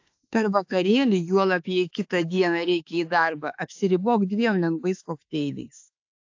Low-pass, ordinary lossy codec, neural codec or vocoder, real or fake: 7.2 kHz; AAC, 48 kbps; autoencoder, 48 kHz, 32 numbers a frame, DAC-VAE, trained on Japanese speech; fake